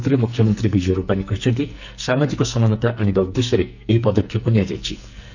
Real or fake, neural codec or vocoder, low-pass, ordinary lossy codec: fake; codec, 44.1 kHz, 2.6 kbps, SNAC; 7.2 kHz; none